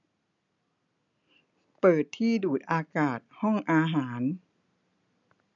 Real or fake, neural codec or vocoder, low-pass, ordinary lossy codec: real; none; 7.2 kHz; none